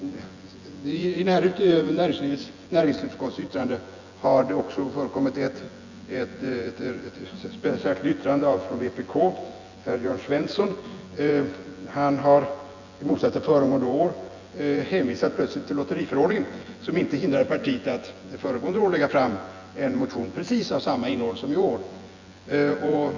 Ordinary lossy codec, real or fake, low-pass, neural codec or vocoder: Opus, 64 kbps; fake; 7.2 kHz; vocoder, 24 kHz, 100 mel bands, Vocos